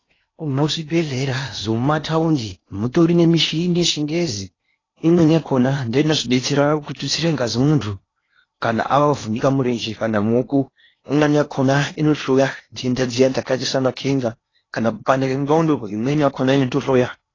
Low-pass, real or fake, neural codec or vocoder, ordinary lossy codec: 7.2 kHz; fake; codec, 16 kHz in and 24 kHz out, 0.8 kbps, FocalCodec, streaming, 65536 codes; AAC, 32 kbps